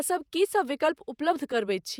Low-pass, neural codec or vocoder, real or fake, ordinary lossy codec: none; vocoder, 48 kHz, 128 mel bands, Vocos; fake; none